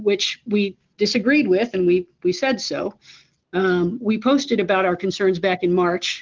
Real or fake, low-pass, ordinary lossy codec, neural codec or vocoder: real; 7.2 kHz; Opus, 16 kbps; none